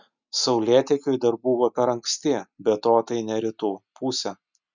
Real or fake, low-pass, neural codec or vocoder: real; 7.2 kHz; none